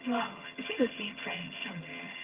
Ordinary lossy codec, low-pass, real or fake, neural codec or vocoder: Opus, 32 kbps; 3.6 kHz; fake; vocoder, 22.05 kHz, 80 mel bands, HiFi-GAN